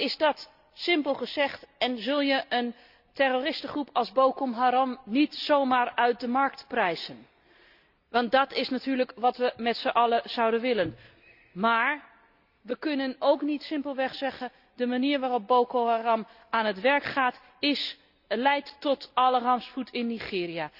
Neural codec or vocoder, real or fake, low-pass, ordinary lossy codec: none; real; 5.4 kHz; Opus, 64 kbps